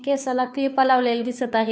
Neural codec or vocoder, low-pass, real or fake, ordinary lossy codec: codec, 16 kHz, 2 kbps, FunCodec, trained on Chinese and English, 25 frames a second; none; fake; none